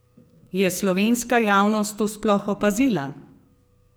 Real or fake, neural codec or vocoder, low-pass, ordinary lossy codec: fake; codec, 44.1 kHz, 2.6 kbps, SNAC; none; none